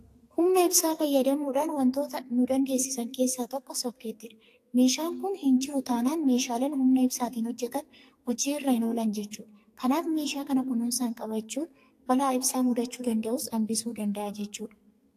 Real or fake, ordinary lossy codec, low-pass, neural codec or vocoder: fake; AAC, 64 kbps; 14.4 kHz; codec, 44.1 kHz, 2.6 kbps, SNAC